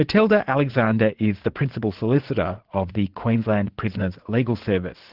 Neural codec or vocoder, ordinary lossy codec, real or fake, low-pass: vocoder, 44.1 kHz, 80 mel bands, Vocos; Opus, 16 kbps; fake; 5.4 kHz